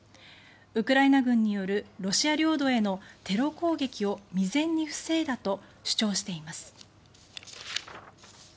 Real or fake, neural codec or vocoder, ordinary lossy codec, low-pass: real; none; none; none